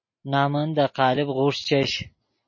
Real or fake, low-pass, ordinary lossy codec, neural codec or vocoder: real; 7.2 kHz; MP3, 32 kbps; none